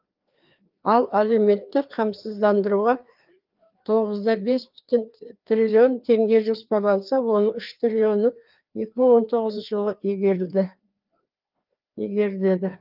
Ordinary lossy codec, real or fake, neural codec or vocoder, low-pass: Opus, 24 kbps; fake; codec, 16 kHz, 2 kbps, FreqCodec, larger model; 5.4 kHz